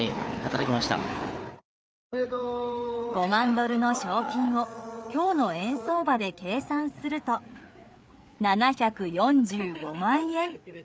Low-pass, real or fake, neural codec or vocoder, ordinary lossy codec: none; fake; codec, 16 kHz, 4 kbps, FreqCodec, larger model; none